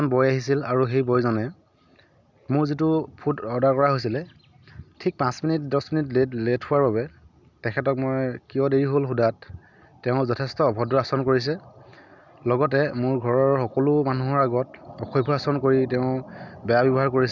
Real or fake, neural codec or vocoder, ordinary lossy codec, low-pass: real; none; none; 7.2 kHz